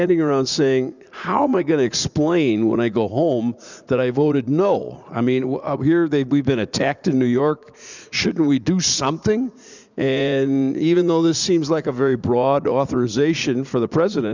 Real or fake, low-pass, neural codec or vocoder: fake; 7.2 kHz; vocoder, 44.1 kHz, 80 mel bands, Vocos